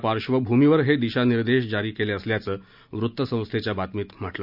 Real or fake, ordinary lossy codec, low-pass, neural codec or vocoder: real; none; 5.4 kHz; none